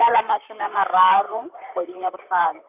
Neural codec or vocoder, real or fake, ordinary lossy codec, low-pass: vocoder, 44.1 kHz, 128 mel bands, Pupu-Vocoder; fake; none; 3.6 kHz